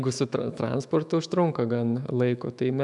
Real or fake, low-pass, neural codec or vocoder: fake; 10.8 kHz; autoencoder, 48 kHz, 128 numbers a frame, DAC-VAE, trained on Japanese speech